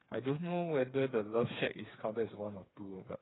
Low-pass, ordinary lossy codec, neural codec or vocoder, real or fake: 7.2 kHz; AAC, 16 kbps; codec, 16 kHz, 4 kbps, FreqCodec, smaller model; fake